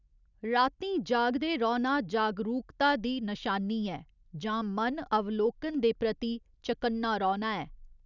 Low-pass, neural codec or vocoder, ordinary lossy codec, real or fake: 7.2 kHz; none; none; real